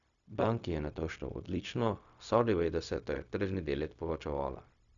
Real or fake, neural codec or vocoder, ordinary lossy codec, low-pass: fake; codec, 16 kHz, 0.4 kbps, LongCat-Audio-Codec; none; 7.2 kHz